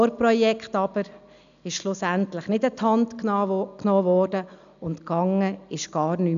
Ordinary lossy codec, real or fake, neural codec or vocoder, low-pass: MP3, 96 kbps; real; none; 7.2 kHz